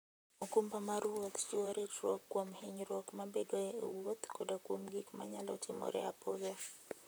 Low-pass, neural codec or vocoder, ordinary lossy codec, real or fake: none; vocoder, 44.1 kHz, 128 mel bands, Pupu-Vocoder; none; fake